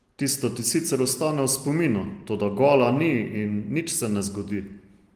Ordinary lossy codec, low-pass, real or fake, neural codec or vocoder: Opus, 24 kbps; 14.4 kHz; real; none